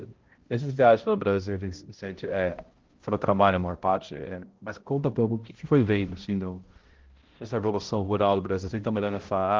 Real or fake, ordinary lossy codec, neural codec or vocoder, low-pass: fake; Opus, 16 kbps; codec, 16 kHz, 0.5 kbps, X-Codec, HuBERT features, trained on balanced general audio; 7.2 kHz